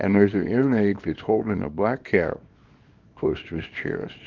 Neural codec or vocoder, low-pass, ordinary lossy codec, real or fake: codec, 24 kHz, 0.9 kbps, WavTokenizer, small release; 7.2 kHz; Opus, 24 kbps; fake